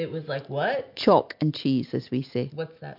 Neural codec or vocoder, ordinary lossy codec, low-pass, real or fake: none; MP3, 48 kbps; 5.4 kHz; real